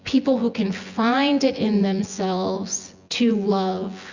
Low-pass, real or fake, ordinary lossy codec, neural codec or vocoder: 7.2 kHz; fake; Opus, 64 kbps; vocoder, 24 kHz, 100 mel bands, Vocos